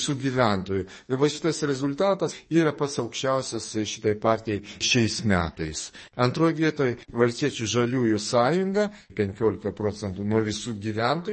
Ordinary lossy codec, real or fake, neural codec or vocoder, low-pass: MP3, 32 kbps; fake; codec, 44.1 kHz, 2.6 kbps, SNAC; 10.8 kHz